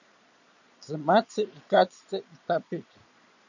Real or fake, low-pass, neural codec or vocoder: fake; 7.2 kHz; vocoder, 22.05 kHz, 80 mel bands, Vocos